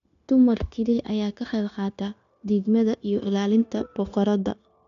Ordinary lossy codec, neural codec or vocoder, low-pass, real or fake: none; codec, 16 kHz, 0.9 kbps, LongCat-Audio-Codec; 7.2 kHz; fake